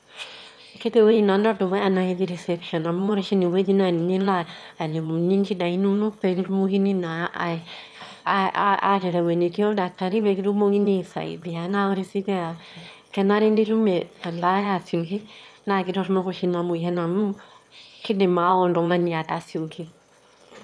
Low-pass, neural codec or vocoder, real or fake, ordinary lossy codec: none; autoencoder, 22.05 kHz, a latent of 192 numbers a frame, VITS, trained on one speaker; fake; none